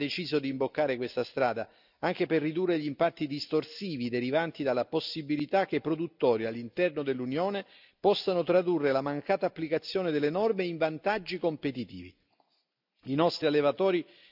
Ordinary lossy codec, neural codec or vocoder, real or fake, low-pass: none; none; real; 5.4 kHz